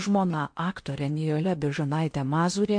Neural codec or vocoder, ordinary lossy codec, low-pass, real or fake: codec, 16 kHz in and 24 kHz out, 0.8 kbps, FocalCodec, streaming, 65536 codes; MP3, 48 kbps; 9.9 kHz; fake